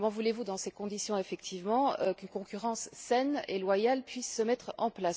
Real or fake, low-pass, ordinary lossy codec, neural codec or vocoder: real; none; none; none